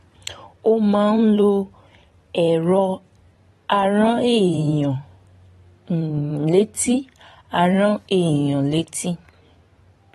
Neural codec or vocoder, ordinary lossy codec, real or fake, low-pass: vocoder, 44.1 kHz, 128 mel bands every 512 samples, BigVGAN v2; AAC, 32 kbps; fake; 19.8 kHz